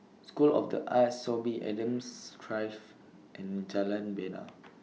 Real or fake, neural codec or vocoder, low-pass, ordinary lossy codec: real; none; none; none